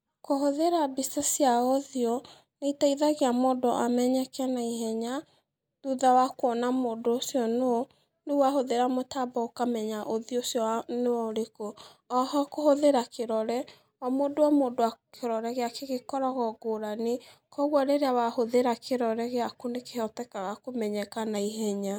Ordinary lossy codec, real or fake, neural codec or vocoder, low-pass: none; real; none; none